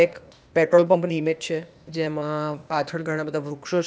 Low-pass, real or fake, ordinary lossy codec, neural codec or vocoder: none; fake; none; codec, 16 kHz, 0.8 kbps, ZipCodec